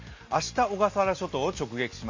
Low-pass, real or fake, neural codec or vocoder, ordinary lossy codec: 7.2 kHz; real; none; MP3, 48 kbps